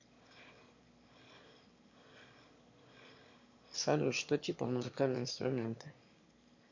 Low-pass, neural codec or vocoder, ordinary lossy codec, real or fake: 7.2 kHz; autoencoder, 22.05 kHz, a latent of 192 numbers a frame, VITS, trained on one speaker; MP3, 48 kbps; fake